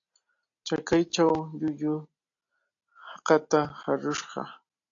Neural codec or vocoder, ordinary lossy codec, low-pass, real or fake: none; AAC, 48 kbps; 7.2 kHz; real